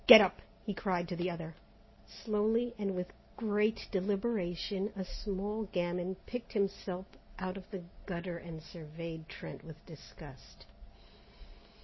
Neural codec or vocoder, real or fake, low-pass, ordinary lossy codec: none; real; 7.2 kHz; MP3, 24 kbps